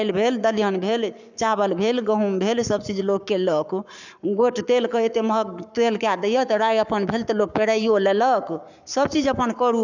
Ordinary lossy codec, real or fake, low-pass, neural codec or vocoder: none; fake; 7.2 kHz; codec, 44.1 kHz, 7.8 kbps, Pupu-Codec